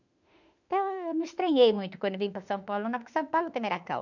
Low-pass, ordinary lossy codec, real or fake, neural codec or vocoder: 7.2 kHz; none; fake; autoencoder, 48 kHz, 32 numbers a frame, DAC-VAE, trained on Japanese speech